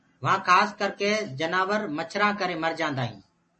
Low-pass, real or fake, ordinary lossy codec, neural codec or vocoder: 10.8 kHz; real; MP3, 32 kbps; none